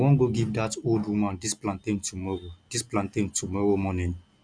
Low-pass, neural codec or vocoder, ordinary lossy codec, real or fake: 9.9 kHz; none; none; real